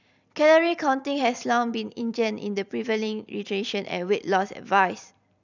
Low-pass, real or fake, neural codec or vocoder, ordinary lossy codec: 7.2 kHz; fake; vocoder, 44.1 kHz, 128 mel bands every 256 samples, BigVGAN v2; none